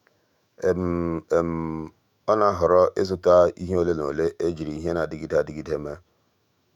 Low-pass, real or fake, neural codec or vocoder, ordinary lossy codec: 19.8 kHz; fake; autoencoder, 48 kHz, 128 numbers a frame, DAC-VAE, trained on Japanese speech; none